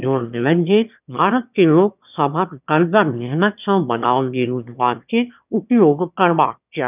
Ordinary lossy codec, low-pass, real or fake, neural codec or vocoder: none; 3.6 kHz; fake; autoencoder, 22.05 kHz, a latent of 192 numbers a frame, VITS, trained on one speaker